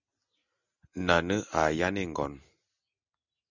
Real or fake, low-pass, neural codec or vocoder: real; 7.2 kHz; none